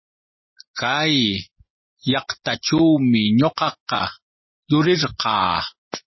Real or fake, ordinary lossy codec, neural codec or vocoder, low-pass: real; MP3, 24 kbps; none; 7.2 kHz